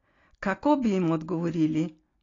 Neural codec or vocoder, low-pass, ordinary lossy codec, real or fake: none; 7.2 kHz; AAC, 32 kbps; real